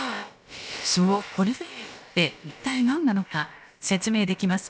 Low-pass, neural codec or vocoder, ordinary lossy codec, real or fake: none; codec, 16 kHz, about 1 kbps, DyCAST, with the encoder's durations; none; fake